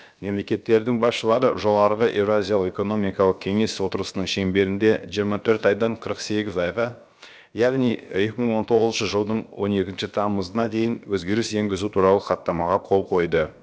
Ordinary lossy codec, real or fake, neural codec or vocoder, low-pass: none; fake; codec, 16 kHz, about 1 kbps, DyCAST, with the encoder's durations; none